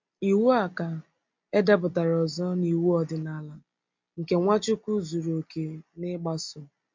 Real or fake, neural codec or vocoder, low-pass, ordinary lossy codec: real; none; 7.2 kHz; MP3, 48 kbps